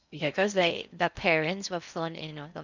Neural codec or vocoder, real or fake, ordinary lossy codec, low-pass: codec, 16 kHz in and 24 kHz out, 0.6 kbps, FocalCodec, streaming, 2048 codes; fake; none; 7.2 kHz